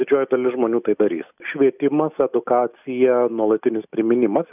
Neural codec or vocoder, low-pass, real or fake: none; 3.6 kHz; real